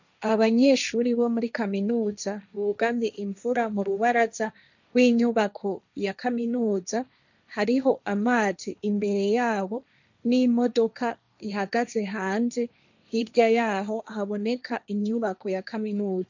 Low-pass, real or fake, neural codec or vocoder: 7.2 kHz; fake; codec, 16 kHz, 1.1 kbps, Voila-Tokenizer